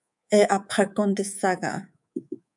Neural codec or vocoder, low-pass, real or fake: codec, 24 kHz, 3.1 kbps, DualCodec; 10.8 kHz; fake